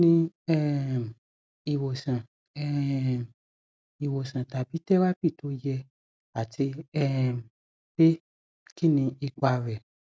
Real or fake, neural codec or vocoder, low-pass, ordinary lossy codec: real; none; none; none